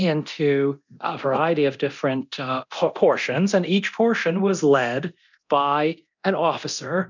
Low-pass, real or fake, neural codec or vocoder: 7.2 kHz; fake; codec, 24 kHz, 0.9 kbps, DualCodec